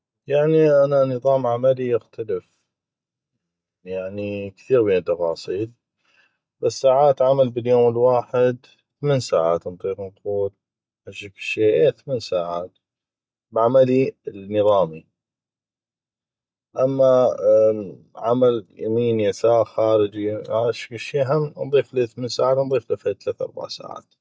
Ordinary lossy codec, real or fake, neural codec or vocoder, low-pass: none; real; none; none